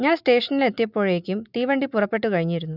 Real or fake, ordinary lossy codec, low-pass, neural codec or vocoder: real; none; 5.4 kHz; none